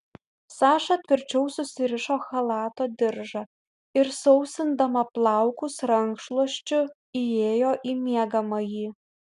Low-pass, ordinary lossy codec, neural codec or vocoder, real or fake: 10.8 kHz; MP3, 96 kbps; none; real